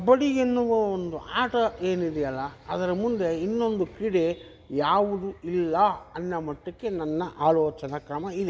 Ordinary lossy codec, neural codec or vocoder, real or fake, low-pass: none; none; real; none